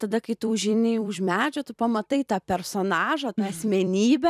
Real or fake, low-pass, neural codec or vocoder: fake; 14.4 kHz; vocoder, 44.1 kHz, 128 mel bands every 256 samples, BigVGAN v2